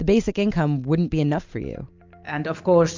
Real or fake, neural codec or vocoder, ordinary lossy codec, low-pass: real; none; MP3, 64 kbps; 7.2 kHz